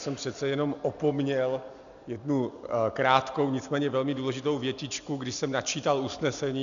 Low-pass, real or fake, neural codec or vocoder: 7.2 kHz; real; none